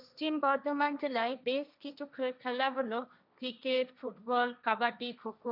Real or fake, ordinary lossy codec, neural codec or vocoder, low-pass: fake; none; codec, 16 kHz, 1.1 kbps, Voila-Tokenizer; 5.4 kHz